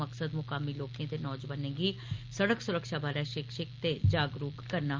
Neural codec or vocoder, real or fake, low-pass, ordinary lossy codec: none; real; 7.2 kHz; Opus, 32 kbps